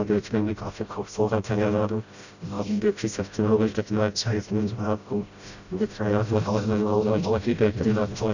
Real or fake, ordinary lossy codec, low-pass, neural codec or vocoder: fake; none; 7.2 kHz; codec, 16 kHz, 0.5 kbps, FreqCodec, smaller model